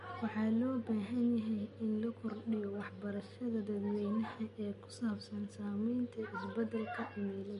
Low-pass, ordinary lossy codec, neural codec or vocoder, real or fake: 14.4 kHz; MP3, 48 kbps; none; real